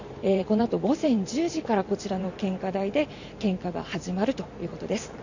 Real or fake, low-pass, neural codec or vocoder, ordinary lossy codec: fake; 7.2 kHz; vocoder, 44.1 kHz, 128 mel bands every 512 samples, BigVGAN v2; none